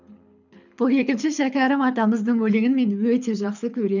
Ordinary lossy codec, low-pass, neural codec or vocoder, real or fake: none; 7.2 kHz; codec, 24 kHz, 6 kbps, HILCodec; fake